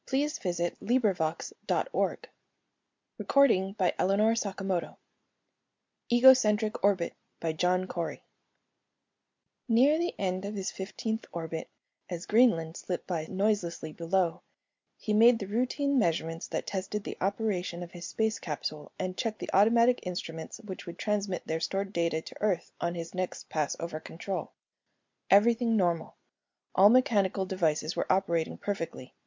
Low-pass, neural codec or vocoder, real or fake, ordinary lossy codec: 7.2 kHz; none; real; MP3, 64 kbps